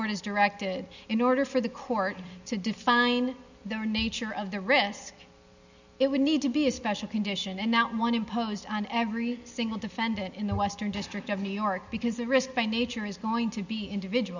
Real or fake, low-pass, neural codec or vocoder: real; 7.2 kHz; none